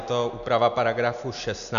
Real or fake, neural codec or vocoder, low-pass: real; none; 7.2 kHz